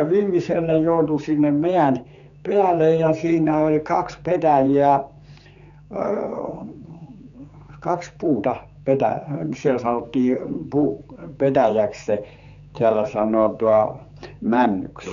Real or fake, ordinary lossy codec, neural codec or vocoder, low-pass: fake; none; codec, 16 kHz, 4 kbps, X-Codec, HuBERT features, trained on general audio; 7.2 kHz